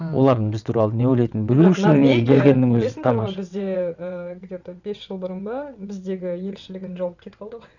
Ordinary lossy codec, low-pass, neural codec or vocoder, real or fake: none; 7.2 kHz; vocoder, 22.05 kHz, 80 mel bands, WaveNeXt; fake